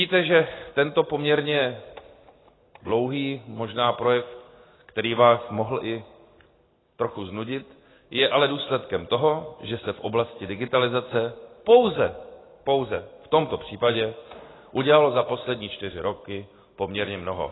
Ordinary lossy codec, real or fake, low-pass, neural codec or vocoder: AAC, 16 kbps; real; 7.2 kHz; none